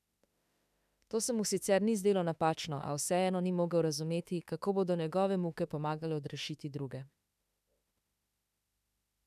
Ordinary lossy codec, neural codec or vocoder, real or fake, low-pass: none; autoencoder, 48 kHz, 32 numbers a frame, DAC-VAE, trained on Japanese speech; fake; 14.4 kHz